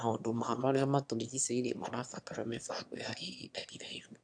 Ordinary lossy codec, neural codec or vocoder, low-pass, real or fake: AAC, 64 kbps; autoencoder, 22.05 kHz, a latent of 192 numbers a frame, VITS, trained on one speaker; 9.9 kHz; fake